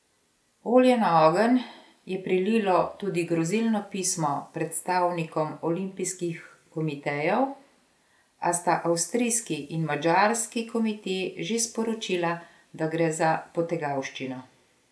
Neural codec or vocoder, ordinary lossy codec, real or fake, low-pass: none; none; real; none